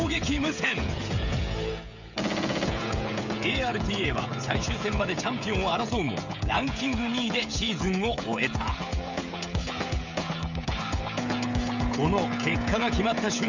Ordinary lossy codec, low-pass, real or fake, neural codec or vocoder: none; 7.2 kHz; fake; codec, 16 kHz, 16 kbps, FreqCodec, smaller model